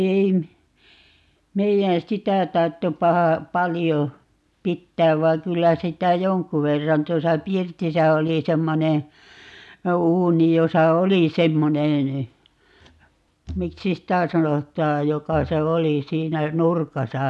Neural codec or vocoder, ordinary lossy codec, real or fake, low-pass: none; none; real; none